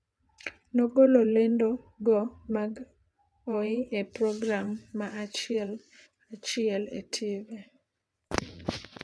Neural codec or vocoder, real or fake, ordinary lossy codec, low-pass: vocoder, 22.05 kHz, 80 mel bands, WaveNeXt; fake; none; none